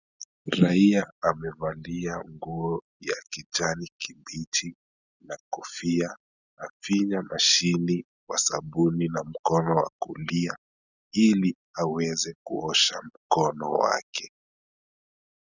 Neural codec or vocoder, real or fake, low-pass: none; real; 7.2 kHz